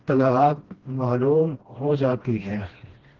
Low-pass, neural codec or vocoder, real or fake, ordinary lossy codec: 7.2 kHz; codec, 16 kHz, 1 kbps, FreqCodec, smaller model; fake; Opus, 16 kbps